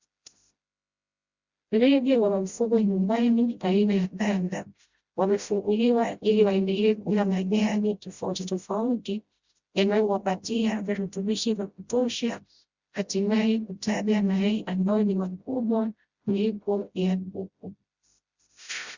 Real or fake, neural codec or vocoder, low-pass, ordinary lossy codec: fake; codec, 16 kHz, 0.5 kbps, FreqCodec, smaller model; 7.2 kHz; Opus, 64 kbps